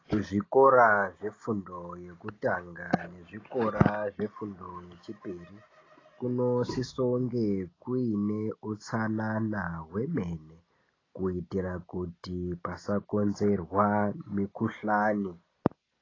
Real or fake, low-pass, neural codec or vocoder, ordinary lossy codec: real; 7.2 kHz; none; AAC, 32 kbps